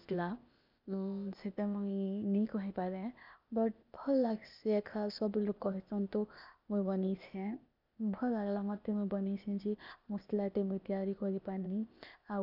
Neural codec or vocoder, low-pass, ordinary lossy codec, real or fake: codec, 16 kHz, 0.8 kbps, ZipCodec; 5.4 kHz; none; fake